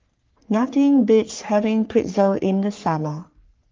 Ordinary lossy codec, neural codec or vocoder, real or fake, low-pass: Opus, 32 kbps; codec, 44.1 kHz, 3.4 kbps, Pupu-Codec; fake; 7.2 kHz